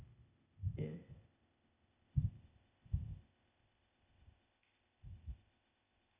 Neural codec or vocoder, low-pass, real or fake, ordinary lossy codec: codec, 16 kHz, 0.8 kbps, ZipCodec; 3.6 kHz; fake; none